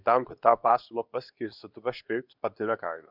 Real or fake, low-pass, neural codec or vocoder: fake; 5.4 kHz; codec, 24 kHz, 0.9 kbps, WavTokenizer, medium speech release version 2